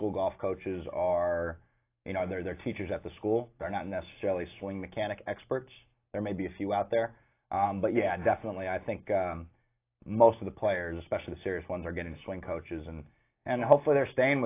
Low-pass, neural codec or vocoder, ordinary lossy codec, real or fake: 3.6 kHz; none; AAC, 24 kbps; real